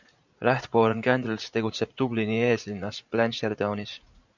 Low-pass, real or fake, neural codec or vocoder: 7.2 kHz; fake; vocoder, 44.1 kHz, 80 mel bands, Vocos